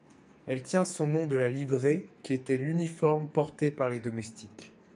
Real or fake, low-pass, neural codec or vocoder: fake; 10.8 kHz; codec, 44.1 kHz, 2.6 kbps, SNAC